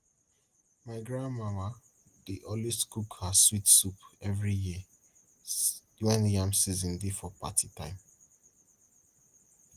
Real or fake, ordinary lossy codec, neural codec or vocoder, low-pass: real; Opus, 24 kbps; none; 14.4 kHz